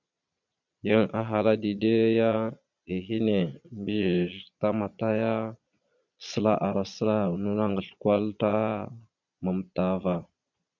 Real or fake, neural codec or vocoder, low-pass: fake; vocoder, 22.05 kHz, 80 mel bands, Vocos; 7.2 kHz